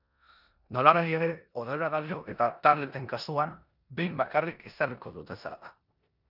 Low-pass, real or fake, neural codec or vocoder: 5.4 kHz; fake; codec, 16 kHz in and 24 kHz out, 0.9 kbps, LongCat-Audio-Codec, four codebook decoder